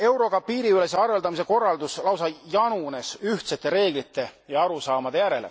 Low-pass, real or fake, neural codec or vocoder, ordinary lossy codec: none; real; none; none